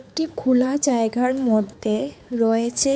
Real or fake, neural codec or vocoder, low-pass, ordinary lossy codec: fake; codec, 16 kHz, 4 kbps, X-Codec, HuBERT features, trained on balanced general audio; none; none